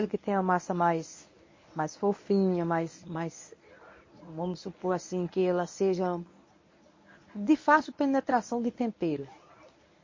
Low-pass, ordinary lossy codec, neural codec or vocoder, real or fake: 7.2 kHz; MP3, 32 kbps; codec, 24 kHz, 0.9 kbps, WavTokenizer, medium speech release version 1; fake